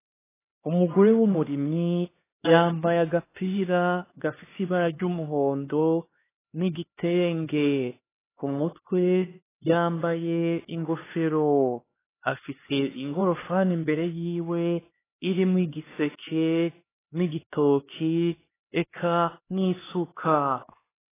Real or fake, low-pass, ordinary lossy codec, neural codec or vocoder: fake; 3.6 kHz; AAC, 16 kbps; codec, 16 kHz, 2 kbps, X-Codec, HuBERT features, trained on LibriSpeech